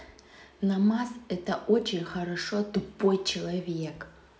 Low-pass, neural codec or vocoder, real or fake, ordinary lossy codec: none; none; real; none